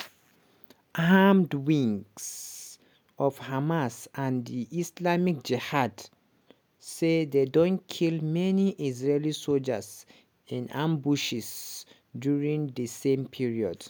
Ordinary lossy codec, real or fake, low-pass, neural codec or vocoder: none; real; none; none